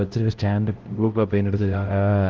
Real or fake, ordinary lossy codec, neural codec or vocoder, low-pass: fake; Opus, 16 kbps; codec, 16 kHz, 0.5 kbps, X-Codec, HuBERT features, trained on LibriSpeech; 7.2 kHz